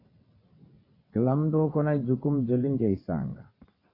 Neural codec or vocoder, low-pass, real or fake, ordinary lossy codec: vocoder, 22.05 kHz, 80 mel bands, WaveNeXt; 5.4 kHz; fake; AAC, 24 kbps